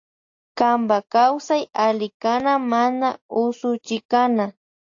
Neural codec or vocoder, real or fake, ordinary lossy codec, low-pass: none; real; AAC, 48 kbps; 7.2 kHz